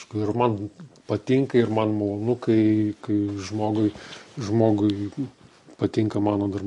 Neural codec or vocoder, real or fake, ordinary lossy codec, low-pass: none; real; MP3, 48 kbps; 14.4 kHz